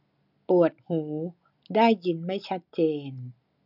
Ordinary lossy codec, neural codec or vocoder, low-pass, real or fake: none; none; 5.4 kHz; real